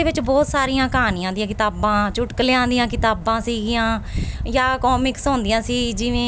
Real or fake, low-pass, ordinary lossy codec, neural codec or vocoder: real; none; none; none